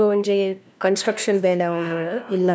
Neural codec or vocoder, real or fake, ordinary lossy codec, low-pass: codec, 16 kHz, 1 kbps, FunCodec, trained on LibriTTS, 50 frames a second; fake; none; none